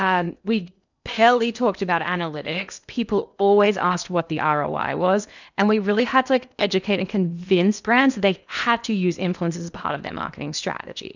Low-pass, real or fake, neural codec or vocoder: 7.2 kHz; fake; codec, 16 kHz in and 24 kHz out, 0.8 kbps, FocalCodec, streaming, 65536 codes